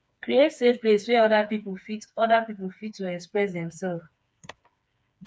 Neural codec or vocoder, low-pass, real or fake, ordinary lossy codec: codec, 16 kHz, 4 kbps, FreqCodec, smaller model; none; fake; none